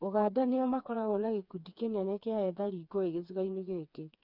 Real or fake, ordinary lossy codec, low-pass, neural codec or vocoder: fake; none; 5.4 kHz; codec, 16 kHz, 4 kbps, FreqCodec, smaller model